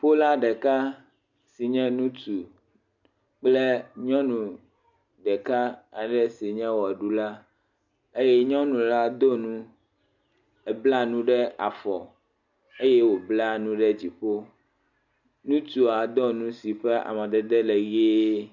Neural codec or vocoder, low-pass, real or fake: none; 7.2 kHz; real